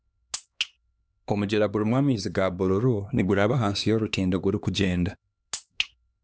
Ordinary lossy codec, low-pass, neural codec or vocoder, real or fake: none; none; codec, 16 kHz, 2 kbps, X-Codec, HuBERT features, trained on LibriSpeech; fake